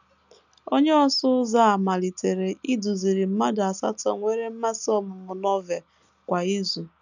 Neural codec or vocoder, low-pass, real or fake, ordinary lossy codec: none; 7.2 kHz; real; none